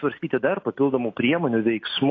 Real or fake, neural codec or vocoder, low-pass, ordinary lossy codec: real; none; 7.2 kHz; AAC, 32 kbps